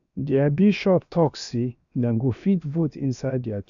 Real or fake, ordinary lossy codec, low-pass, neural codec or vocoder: fake; none; 7.2 kHz; codec, 16 kHz, 0.7 kbps, FocalCodec